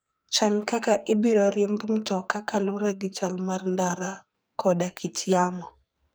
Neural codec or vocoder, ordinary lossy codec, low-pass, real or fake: codec, 44.1 kHz, 2.6 kbps, SNAC; none; none; fake